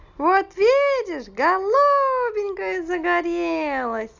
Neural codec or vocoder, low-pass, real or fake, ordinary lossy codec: none; 7.2 kHz; real; Opus, 64 kbps